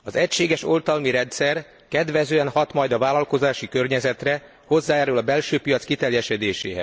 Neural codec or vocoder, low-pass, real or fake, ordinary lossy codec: none; none; real; none